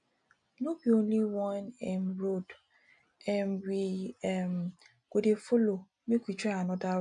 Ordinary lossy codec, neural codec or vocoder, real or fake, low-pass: none; none; real; 9.9 kHz